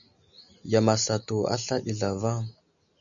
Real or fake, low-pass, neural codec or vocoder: real; 7.2 kHz; none